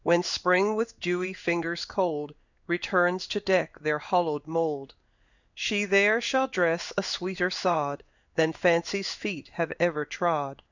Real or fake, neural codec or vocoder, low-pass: fake; codec, 16 kHz in and 24 kHz out, 1 kbps, XY-Tokenizer; 7.2 kHz